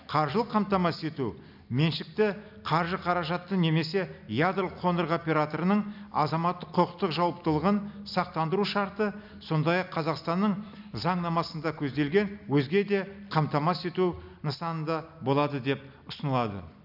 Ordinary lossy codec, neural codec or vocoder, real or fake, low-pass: none; none; real; 5.4 kHz